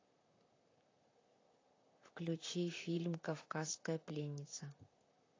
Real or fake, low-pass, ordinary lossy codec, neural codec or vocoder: fake; 7.2 kHz; AAC, 32 kbps; vocoder, 44.1 kHz, 128 mel bands, Pupu-Vocoder